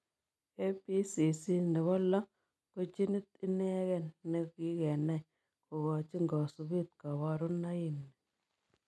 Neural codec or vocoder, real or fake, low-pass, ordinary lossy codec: none; real; none; none